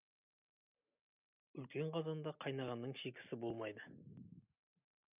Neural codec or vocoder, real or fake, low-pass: none; real; 3.6 kHz